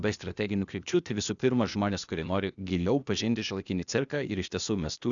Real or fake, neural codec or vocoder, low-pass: fake; codec, 16 kHz, 0.8 kbps, ZipCodec; 7.2 kHz